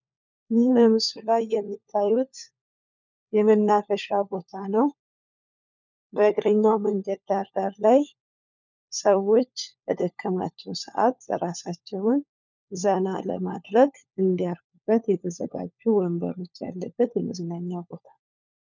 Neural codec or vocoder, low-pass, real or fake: codec, 16 kHz, 4 kbps, FunCodec, trained on LibriTTS, 50 frames a second; 7.2 kHz; fake